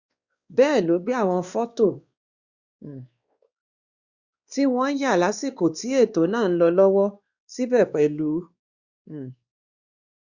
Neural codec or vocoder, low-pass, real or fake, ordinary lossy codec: codec, 16 kHz, 2 kbps, X-Codec, WavLM features, trained on Multilingual LibriSpeech; 7.2 kHz; fake; Opus, 64 kbps